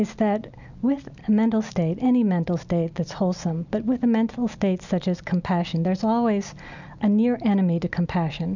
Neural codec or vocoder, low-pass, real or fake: none; 7.2 kHz; real